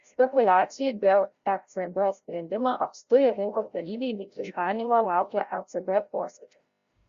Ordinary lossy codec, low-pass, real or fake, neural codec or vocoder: Opus, 64 kbps; 7.2 kHz; fake; codec, 16 kHz, 0.5 kbps, FreqCodec, larger model